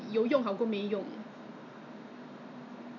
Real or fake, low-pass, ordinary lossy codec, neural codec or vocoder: real; 7.2 kHz; none; none